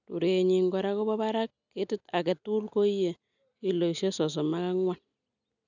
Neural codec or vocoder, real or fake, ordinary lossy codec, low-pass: none; real; none; 7.2 kHz